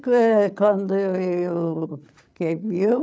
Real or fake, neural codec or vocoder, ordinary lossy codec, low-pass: fake; codec, 16 kHz, 8 kbps, FreqCodec, larger model; none; none